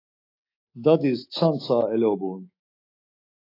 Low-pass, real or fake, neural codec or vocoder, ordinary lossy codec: 5.4 kHz; fake; autoencoder, 48 kHz, 128 numbers a frame, DAC-VAE, trained on Japanese speech; AAC, 32 kbps